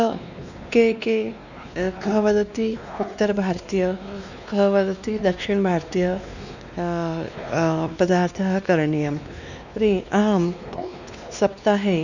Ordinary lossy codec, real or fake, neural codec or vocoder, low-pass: none; fake; codec, 16 kHz, 2 kbps, X-Codec, WavLM features, trained on Multilingual LibriSpeech; 7.2 kHz